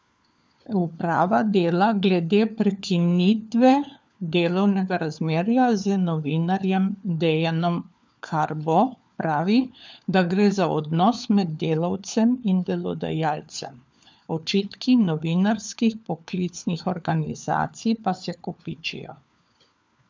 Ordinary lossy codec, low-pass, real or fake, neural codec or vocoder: none; none; fake; codec, 16 kHz, 4 kbps, FunCodec, trained on LibriTTS, 50 frames a second